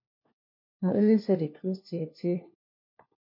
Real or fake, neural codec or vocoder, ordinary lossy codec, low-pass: fake; codec, 16 kHz, 1 kbps, FunCodec, trained on LibriTTS, 50 frames a second; MP3, 32 kbps; 5.4 kHz